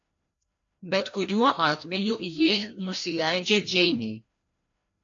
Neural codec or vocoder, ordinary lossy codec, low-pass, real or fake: codec, 16 kHz, 1 kbps, FreqCodec, larger model; AAC, 48 kbps; 7.2 kHz; fake